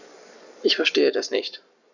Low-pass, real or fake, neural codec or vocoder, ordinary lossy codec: 7.2 kHz; fake; codec, 44.1 kHz, 7.8 kbps, Pupu-Codec; none